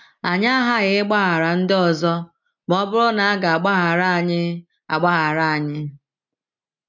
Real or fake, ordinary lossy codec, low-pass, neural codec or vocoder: real; none; 7.2 kHz; none